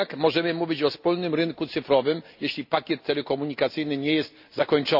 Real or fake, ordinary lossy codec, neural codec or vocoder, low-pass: real; AAC, 48 kbps; none; 5.4 kHz